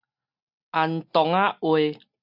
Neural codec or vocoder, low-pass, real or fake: none; 5.4 kHz; real